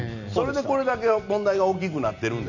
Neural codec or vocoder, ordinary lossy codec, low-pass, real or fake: none; none; 7.2 kHz; real